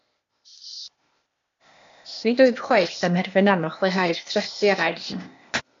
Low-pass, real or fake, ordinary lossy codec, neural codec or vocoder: 7.2 kHz; fake; AAC, 64 kbps; codec, 16 kHz, 0.8 kbps, ZipCodec